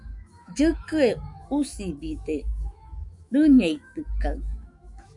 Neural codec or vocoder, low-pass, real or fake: autoencoder, 48 kHz, 128 numbers a frame, DAC-VAE, trained on Japanese speech; 10.8 kHz; fake